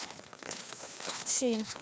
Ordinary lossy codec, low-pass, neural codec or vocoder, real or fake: none; none; codec, 16 kHz, 2 kbps, FreqCodec, larger model; fake